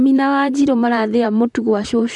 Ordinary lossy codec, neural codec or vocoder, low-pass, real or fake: MP3, 96 kbps; vocoder, 44.1 kHz, 128 mel bands, Pupu-Vocoder; 10.8 kHz; fake